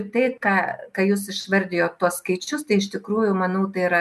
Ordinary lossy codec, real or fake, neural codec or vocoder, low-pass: MP3, 96 kbps; real; none; 14.4 kHz